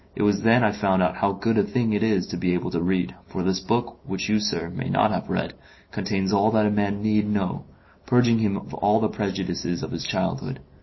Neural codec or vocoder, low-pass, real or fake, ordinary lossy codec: none; 7.2 kHz; real; MP3, 24 kbps